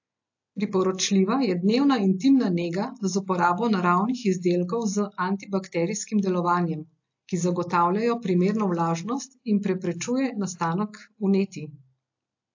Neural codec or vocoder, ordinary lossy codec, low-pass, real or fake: none; AAC, 48 kbps; 7.2 kHz; real